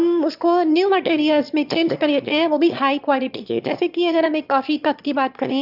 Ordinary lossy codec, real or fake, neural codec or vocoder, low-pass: none; fake; autoencoder, 22.05 kHz, a latent of 192 numbers a frame, VITS, trained on one speaker; 5.4 kHz